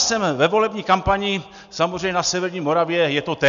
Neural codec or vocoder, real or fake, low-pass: none; real; 7.2 kHz